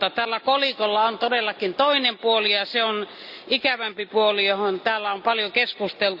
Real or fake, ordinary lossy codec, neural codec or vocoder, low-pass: real; Opus, 64 kbps; none; 5.4 kHz